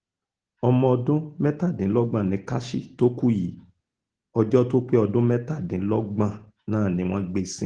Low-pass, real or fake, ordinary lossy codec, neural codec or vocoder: 9.9 kHz; real; Opus, 16 kbps; none